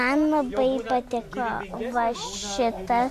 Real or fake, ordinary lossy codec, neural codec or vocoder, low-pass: real; MP3, 64 kbps; none; 14.4 kHz